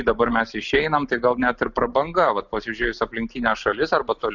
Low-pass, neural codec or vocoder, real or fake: 7.2 kHz; none; real